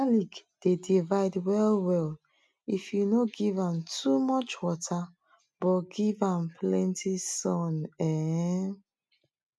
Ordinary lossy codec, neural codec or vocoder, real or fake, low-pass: none; none; real; none